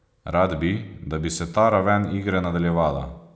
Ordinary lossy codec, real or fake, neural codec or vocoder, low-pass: none; real; none; none